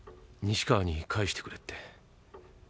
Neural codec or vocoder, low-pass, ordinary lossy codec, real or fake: none; none; none; real